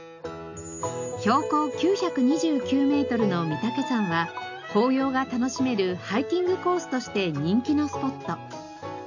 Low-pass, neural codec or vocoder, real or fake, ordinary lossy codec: 7.2 kHz; none; real; none